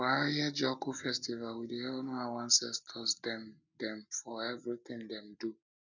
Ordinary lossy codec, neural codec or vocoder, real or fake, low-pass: none; none; real; 7.2 kHz